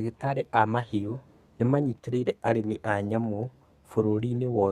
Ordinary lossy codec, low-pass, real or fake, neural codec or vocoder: Opus, 64 kbps; 14.4 kHz; fake; codec, 32 kHz, 1.9 kbps, SNAC